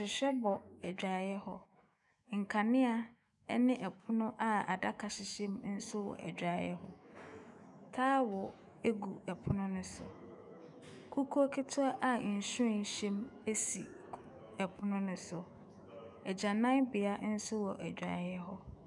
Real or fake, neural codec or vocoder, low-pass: fake; autoencoder, 48 kHz, 128 numbers a frame, DAC-VAE, trained on Japanese speech; 10.8 kHz